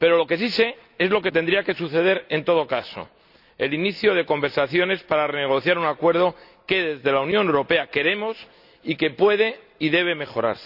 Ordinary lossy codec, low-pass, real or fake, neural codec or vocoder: none; 5.4 kHz; real; none